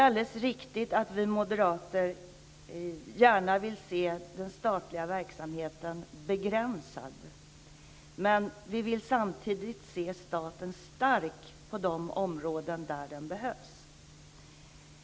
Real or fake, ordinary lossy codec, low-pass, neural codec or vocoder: real; none; none; none